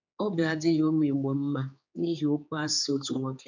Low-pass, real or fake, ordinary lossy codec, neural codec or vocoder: 7.2 kHz; fake; MP3, 64 kbps; codec, 16 kHz, 4 kbps, X-Codec, HuBERT features, trained on general audio